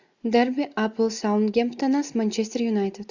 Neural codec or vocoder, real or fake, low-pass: none; real; 7.2 kHz